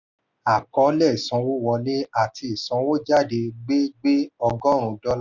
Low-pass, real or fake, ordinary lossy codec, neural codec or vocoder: 7.2 kHz; real; none; none